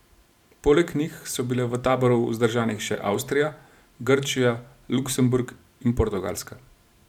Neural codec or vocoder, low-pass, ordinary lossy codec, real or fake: vocoder, 44.1 kHz, 128 mel bands every 512 samples, BigVGAN v2; 19.8 kHz; none; fake